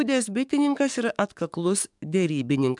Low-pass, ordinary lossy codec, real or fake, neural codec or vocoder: 10.8 kHz; MP3, 96 kbps; fake; codec, 44.1 kHz, 7.8 kbps, DAC